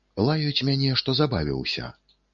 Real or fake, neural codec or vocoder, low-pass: real; none; 7.2 kHz